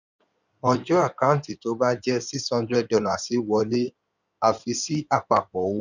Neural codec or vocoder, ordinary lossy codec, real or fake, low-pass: codec, 16 kHz in and 24 kHz out, 2.2 kbps, FireRedTTS-2 codec; none; fake; 7.2 kHz